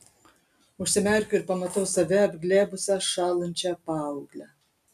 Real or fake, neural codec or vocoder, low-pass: real; none; 14.4 kHz